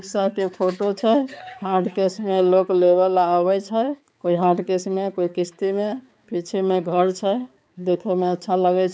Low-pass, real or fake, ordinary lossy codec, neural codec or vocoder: none; fake; none; codec, 16 kHz, 4 kbps, X-Codec, HuBERT features, trained on balanced general audio